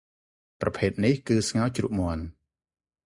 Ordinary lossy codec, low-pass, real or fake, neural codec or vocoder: Opus, 64 kbps; 10.8 kHz; real; none